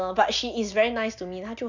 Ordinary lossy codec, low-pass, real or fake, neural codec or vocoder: none; 7.2 kHz; real; none